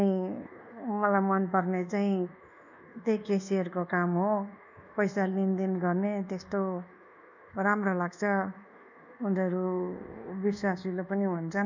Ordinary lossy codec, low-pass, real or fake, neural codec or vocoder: none; 7.2 kHz; fake; codec, 24 kHz, 1.2 kbps, DualCodec